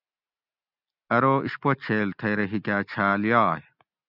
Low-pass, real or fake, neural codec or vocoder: 5.4 kHz; real; none